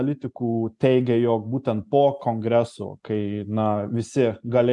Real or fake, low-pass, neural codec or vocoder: real; 10.8 kHz; none